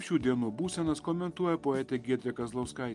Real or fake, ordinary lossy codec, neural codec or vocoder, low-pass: real; Opus, 32 kbps; none; 10.8 kHz